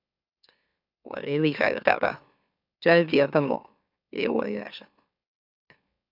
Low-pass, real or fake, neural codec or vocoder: 5.4 kHz; fake; autoencoder, 44.1 kHz, a latent of 192 numbers a frame, MeloTTS